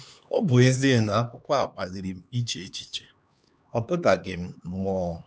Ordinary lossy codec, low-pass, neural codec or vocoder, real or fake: none; none; codec, 16 kHz, 2 kbps, X-Codec, HuBERT features, trained on LibriSpeech; fake